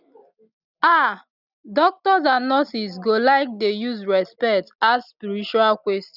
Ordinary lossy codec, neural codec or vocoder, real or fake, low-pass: none; none; real; 5.4 kHz